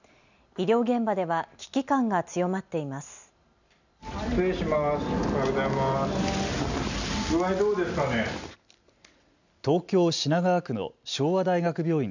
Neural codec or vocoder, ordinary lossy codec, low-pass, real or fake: none; none; 7.2 kHz; real